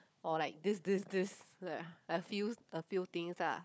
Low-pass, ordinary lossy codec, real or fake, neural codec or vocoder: none; none; fake; codec, 16 kHz, 4 kbps, FunCodec, trained on Chinese and English, 50 frames a second